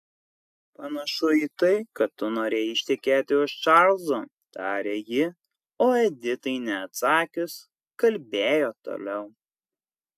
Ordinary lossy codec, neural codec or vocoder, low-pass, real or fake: AAC, 96 kbps; none; 14.4 kHz; real